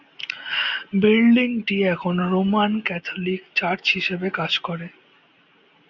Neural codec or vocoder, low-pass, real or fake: none; 7.2 kHz; real